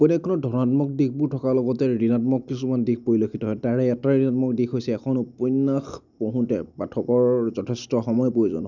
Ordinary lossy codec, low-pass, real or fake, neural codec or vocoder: none; 7.2 kHz; real; none